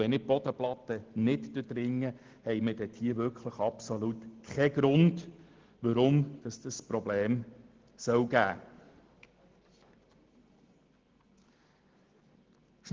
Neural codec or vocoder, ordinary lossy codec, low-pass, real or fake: none; Opus, 16 kbps; 7.2 kHz; real